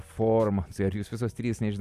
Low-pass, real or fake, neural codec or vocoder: 14.4 kHz; real; none